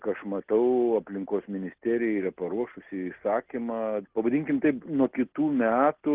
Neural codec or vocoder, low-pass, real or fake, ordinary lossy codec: none; 3.6 kHz; real; Opus, 16 kbps